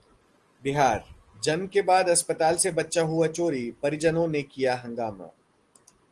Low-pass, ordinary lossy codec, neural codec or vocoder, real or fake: 10.8 kHz; Opus, 24 kbps; none; real